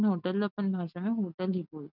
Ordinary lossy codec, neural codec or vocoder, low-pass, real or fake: none; none; 5.4 kHz; real